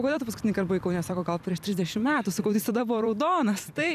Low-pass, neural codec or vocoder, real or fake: 14.4 kHz; vocoder, 44.1 kHz, 128 mel bands every 256 samples, BigVGAN v2; fake